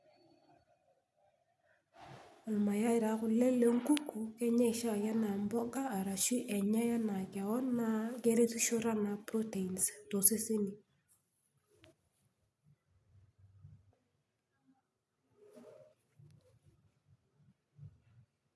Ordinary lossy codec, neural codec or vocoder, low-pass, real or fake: none; none; none; real